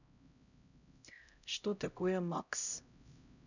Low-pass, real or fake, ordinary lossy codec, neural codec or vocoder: 7.2 kHz; fake; none; codec, 16 kHz, 0.5 kbps, X-Codec, HuBERT features, trained on LibriSpeech